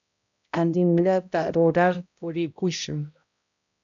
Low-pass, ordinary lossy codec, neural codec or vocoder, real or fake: 7.2 kHz; MP3, 96 kbps; codec, 16 kHz, 0.5 kbps, X-Codec, HuBERT features, trained on balanced general audio; fake